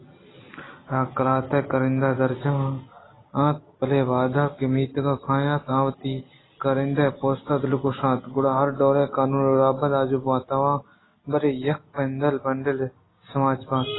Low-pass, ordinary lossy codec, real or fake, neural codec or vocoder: 7.2 kHz; AAC, 16 kbps; real; none